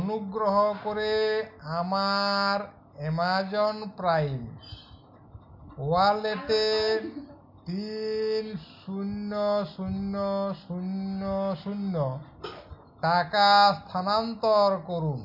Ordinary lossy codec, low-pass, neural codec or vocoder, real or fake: MP3, 48 kbps; 5.4 kHz; none; real